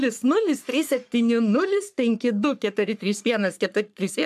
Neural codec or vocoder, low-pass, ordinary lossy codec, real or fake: codec, 44.1 kHz, 3.4 kbps, Pupu-Codec; 14.4 kHz; MP3, 96 kbps; fake